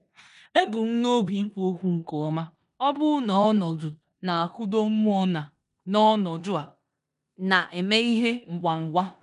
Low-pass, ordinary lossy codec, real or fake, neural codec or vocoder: 10.8 kHz; none; fake; codec, 16 kHz in and 24 kHz out, 0.9 kbps, LongCat-Audio-Codec, four codebook decoder